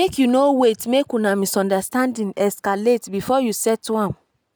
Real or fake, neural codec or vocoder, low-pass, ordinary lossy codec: real; none; none; none